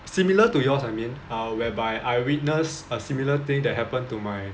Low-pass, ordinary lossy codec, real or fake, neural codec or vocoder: none; none; real; none